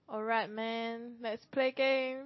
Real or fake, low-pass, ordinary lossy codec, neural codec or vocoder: real; 7.2 kHz; MP3, 24 kbps; none